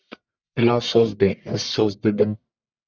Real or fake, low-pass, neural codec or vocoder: fake; 7.2 kHz; codec, 44.1 kHz, 1.7 kbps, Pupu-Codec